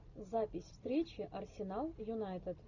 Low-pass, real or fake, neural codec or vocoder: 7.2 kHz; real; none